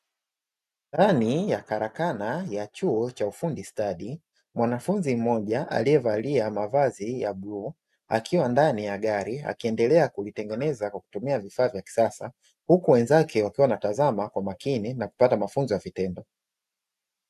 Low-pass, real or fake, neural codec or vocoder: 14.4 kHz; real; none